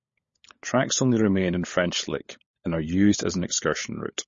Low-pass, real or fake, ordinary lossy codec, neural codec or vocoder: 7.2 kHz; fake; MP3, 32 kbps; codec, 16 kHz, 16 kbps, FunCodec, trained on LibriTTS, 50 frames a second